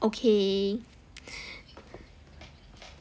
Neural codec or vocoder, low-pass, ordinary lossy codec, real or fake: none; none; none; real